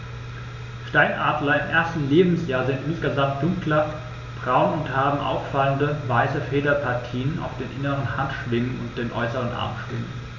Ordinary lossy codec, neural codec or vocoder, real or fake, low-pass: none; none; real; 7.2 kHz